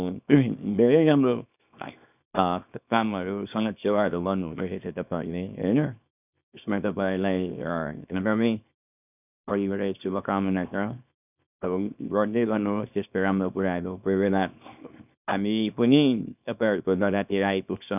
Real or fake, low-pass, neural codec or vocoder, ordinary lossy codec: fake; 3.6 kHz; codec, 24 kHz, 0.9 kbps, WavTokenizer, small release; none